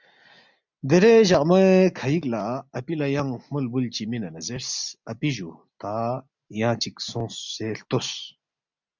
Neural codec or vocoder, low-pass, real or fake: none; 7.2 kHz; real